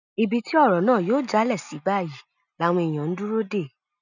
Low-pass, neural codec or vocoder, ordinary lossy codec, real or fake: 7.2 kHz; none; none; real